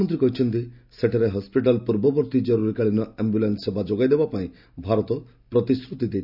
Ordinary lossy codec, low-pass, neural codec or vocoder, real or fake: none; 5.4 kHz; none; real